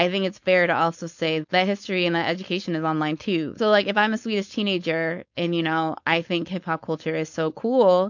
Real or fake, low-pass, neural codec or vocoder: fake; 7.2 kHz; codec, 16 kHz, 4.8 kbps, FACodec